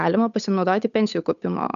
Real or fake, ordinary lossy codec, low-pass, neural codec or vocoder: fake; AAC, 96 kbps; 7.2 kHz; codec, 16 kHz, 8 kbps, FunCodec, trained on Chinese and English, 25 frames a second